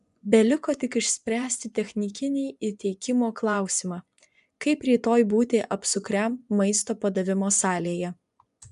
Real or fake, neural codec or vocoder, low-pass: fake; vocoder, 24 kHz, 100 mel bands, Vocos; 10.8 kHz